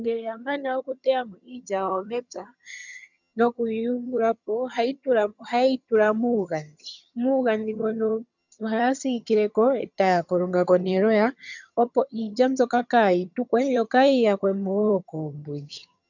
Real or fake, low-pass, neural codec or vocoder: fake; 7.2 kHz; vocoder, 22.05 kHz, 80 mel bands, HiFi-GAN